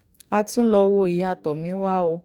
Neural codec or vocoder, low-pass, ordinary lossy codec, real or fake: codec, 44.1 kHz, 2.6 kbps, DAC; 19.8 kHz; none; fake